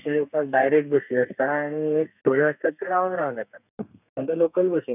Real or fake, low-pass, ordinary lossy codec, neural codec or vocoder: fake; 3.6 kHz; none; codec, 32 kHz, 1.9 kbps, SNAC